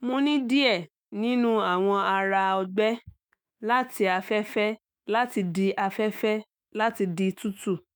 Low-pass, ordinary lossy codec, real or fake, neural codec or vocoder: none; none; fake; autoencoder, 48 kHz, 128 numbers a frame, DAC-VAE, trained on Japanese speech